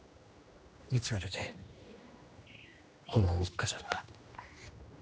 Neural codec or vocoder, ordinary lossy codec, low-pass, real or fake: codec, 16 kHz, 1 kbps, X-Codec, HuBERT features, trained on general audio; none; none; fake